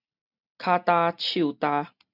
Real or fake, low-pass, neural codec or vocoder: real; 5.4 kHz; none